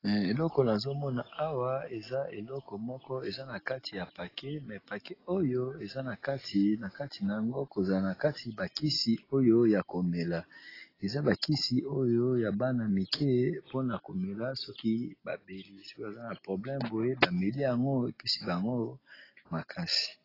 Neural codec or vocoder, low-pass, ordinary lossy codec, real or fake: none; 5.4 kHz; AAC, 24 kbps; real